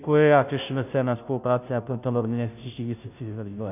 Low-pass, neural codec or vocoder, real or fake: 3.6 kHz; codec, 16 kHz, 0.5 kbps, FunCodec, trained on Chinese and English, 25 frames a second; fake